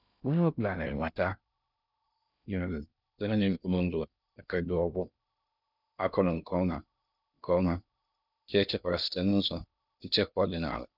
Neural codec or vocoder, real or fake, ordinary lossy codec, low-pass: codec, 16 kHz in and 24 kHz out, 0.6 kbps, FocalCodec, streaming, 2048 codes; fake; none; 5.4 kHz